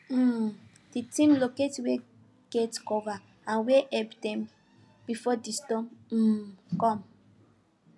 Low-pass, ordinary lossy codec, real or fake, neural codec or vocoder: none; none; real; none